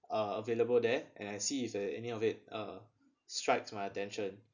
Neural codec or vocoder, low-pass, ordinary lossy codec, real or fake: none; 7.2 kHz; none; real